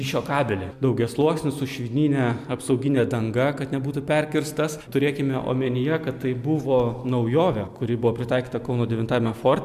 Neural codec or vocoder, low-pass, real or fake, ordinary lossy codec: none; 14.4 kHz; real; MP3, 96 kbps